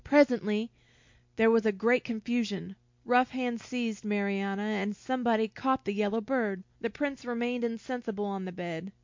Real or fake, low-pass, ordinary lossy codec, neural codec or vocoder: real; 7.2 kHz; MP3, 48 kbps; none